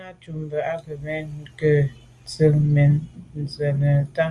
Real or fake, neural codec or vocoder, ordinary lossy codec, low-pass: real; none; Opus, 32 kbps; 10.8 kHz